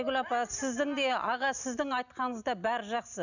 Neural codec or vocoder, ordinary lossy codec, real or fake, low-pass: none; none; real; 7.2 kHz